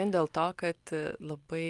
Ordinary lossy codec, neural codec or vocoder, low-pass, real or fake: Opus, 32 kbps; none; 10.8 kHz; real